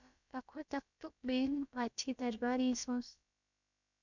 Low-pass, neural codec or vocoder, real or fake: 7.2 kHz; codec, 16 kHz, about 1 kbps, DyCAST, with the encoder's durations; fake